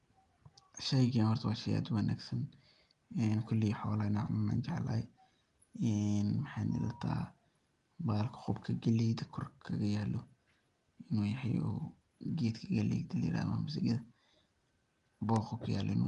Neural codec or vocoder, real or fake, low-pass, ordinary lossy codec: none; real; 10.8 kHz; Opus, 32 kbps